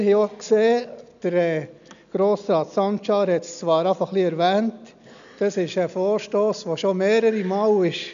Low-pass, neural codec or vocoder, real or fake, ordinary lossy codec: 7.2 kHz; none; real; none